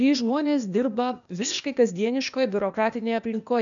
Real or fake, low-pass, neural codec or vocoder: fake; 7.2 kHz; codec, 16 kHz, 0.8 kbps, ZipCodec